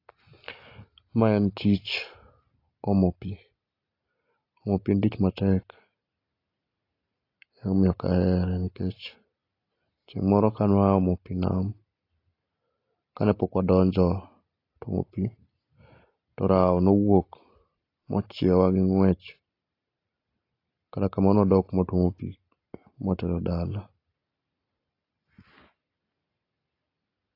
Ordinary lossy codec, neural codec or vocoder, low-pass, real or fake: AAC, 32 kbps; none; 5.4 kHz; real